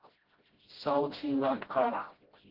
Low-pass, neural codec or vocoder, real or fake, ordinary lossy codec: 5.4 kHz; codec, 16 kHz, 0.5 kbps, FreqCodec, smaller model; fake; Opus, 16 kbps